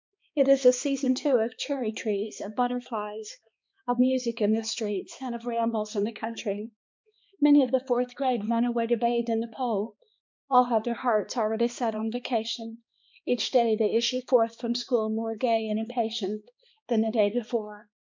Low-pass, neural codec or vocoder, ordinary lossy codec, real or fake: 7.2 kHz; codec, 16 kHz, 2 kbps, X-Codec, HuBERT features, trained on balanced general audio; MP3, 64 kbps; fake